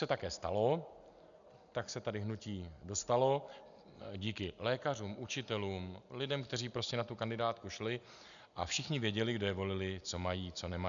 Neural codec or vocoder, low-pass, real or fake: none; 7.2 kHz; real